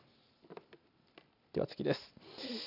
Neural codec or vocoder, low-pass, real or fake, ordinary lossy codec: none; 5.4 kHz; real; none